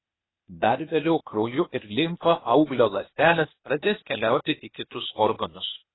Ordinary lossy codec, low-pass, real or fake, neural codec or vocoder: AAC, 16 kbps; 7.2 kHz; fake; codec, 16 kHz, 0.8 kbps, ZipCodec